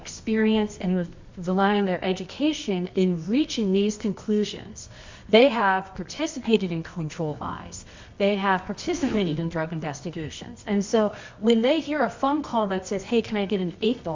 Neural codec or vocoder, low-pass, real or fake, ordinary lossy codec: codec, 24 kHz, 0.9 kbps, WavTokenizer, medium music audio release; 7.2 kHz; fake; MP3, 64 kbps